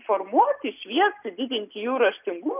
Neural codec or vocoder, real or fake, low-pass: none; real; 3.6 kHz